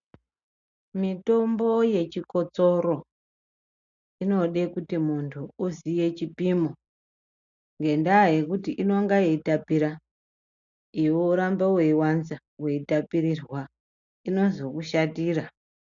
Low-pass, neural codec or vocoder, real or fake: 7.2 kHz; none; real